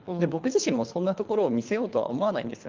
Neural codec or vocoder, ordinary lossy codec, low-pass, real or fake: codec, 24 kHz, 3 kbps, HILCodec; Opus, 24 kbps; 7.2 kHz; fake